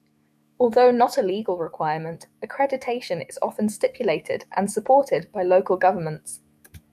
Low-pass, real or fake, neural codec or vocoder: 14.4 kHz; fake; autoencoder, 48 kHz, 128 numbers a frame, DAC-VAE, trained on Japanese speech